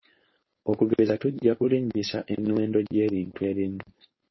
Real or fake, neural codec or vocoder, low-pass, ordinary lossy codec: fake; codec, 16 kHz, 4.8 kbps, FACodec; 7.2 kHz; MP3, 24 kbps